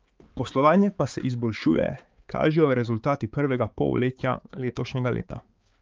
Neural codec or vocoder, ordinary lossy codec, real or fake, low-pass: codec, 16 kHz, 4 kbps, X-Codec, HuBERT features, trained on balanced general audio; Opus, 24 kbps; fake; 7.2 kHz